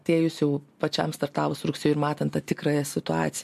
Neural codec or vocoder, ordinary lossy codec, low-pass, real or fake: none; MP3, 64 kbps; 14.4 kHz; real